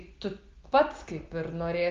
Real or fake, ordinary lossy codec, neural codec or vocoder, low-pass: real; Opus, 32 kbps; none; 7.2 kHz